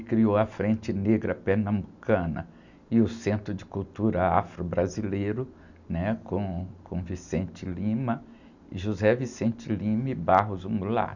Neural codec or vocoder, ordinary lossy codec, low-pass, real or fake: none; none; 7.2 kHz; real